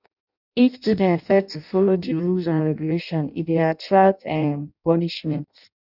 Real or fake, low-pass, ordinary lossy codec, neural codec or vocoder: fake; 5.4 kHz; none; codec, 16 kHz in and 24 kHz out, 0.6 kbps, FireRedTTS-2 codec